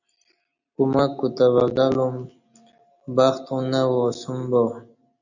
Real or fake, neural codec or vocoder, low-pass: real; none; 7.2 kHz